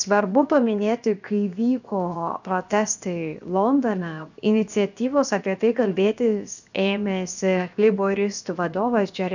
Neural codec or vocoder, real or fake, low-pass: codec, 16 kHz, 0.7 kbps, FocalCodec; fake; 7.2 kHz